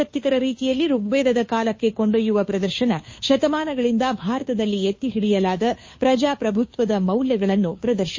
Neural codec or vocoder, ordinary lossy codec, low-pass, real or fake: codec, 16 kHz, 2 kbps, FunCodec, trained on Chinese and English, 25 frames a second; MP3, 32 kbps; 7.2 kHz; fake